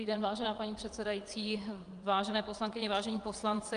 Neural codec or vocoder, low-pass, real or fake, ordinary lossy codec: vocoder, 22.05 kHz, 80 mel bands, WaveNeXt; 9.9 kHz; fake; Opus, 32 kbps